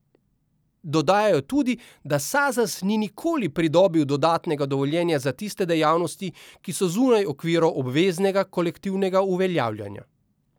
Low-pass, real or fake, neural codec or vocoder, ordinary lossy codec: none; real; none; none